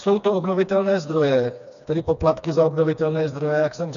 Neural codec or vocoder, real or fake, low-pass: codec, 16 kHz, 2 kbps, FreqCodec, smaller model; fake; 7.2 kHz